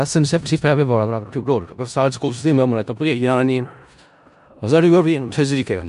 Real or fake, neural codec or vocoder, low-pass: fake; codec, 16 kHz in and 24 kHz out, 0.4 kbps, LongCat-Audio-Codec, four codebook decoder; 10.8 kHz